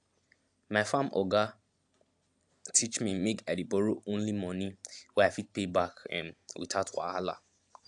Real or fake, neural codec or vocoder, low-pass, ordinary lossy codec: real; none; 10.8 kHz; AAC, 64 kbps